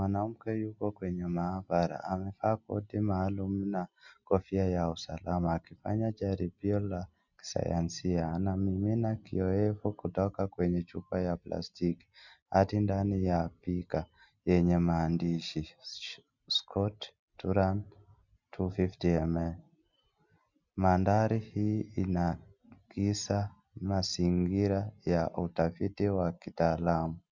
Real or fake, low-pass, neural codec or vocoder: real; 7.2 kHz; none